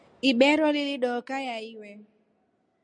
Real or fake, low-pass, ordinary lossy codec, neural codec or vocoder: real; 9.9 kHz; MP3, 96 kbps; none